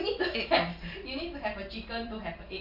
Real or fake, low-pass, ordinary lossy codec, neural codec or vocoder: real; 5.4 kHz; none; none